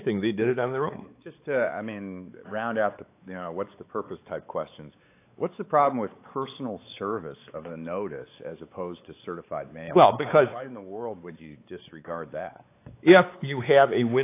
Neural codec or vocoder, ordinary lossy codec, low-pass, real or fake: codec, 16 kHz, 4 kbps, X-Codec, WavLM features, trained on Multilingual LibriSpeech; AAC, 24 kbps; 3.6 kHz; fake